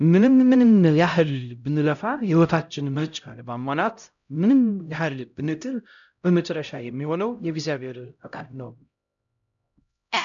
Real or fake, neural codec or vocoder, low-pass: fake; codec, 16 kHz, 0.5 kbps, X-Codec, HuBERT features, trained on LibriSpeech; 7.2 kHz